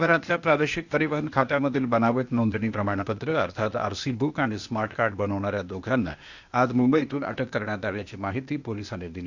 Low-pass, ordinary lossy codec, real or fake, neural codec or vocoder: 7.2 kHz; Opus, 64 kbps; fake; codec, 16 kHz, 0.8 kbps, ZipCodec